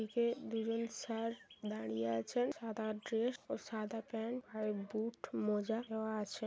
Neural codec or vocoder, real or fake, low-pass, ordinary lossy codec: none; real; none; none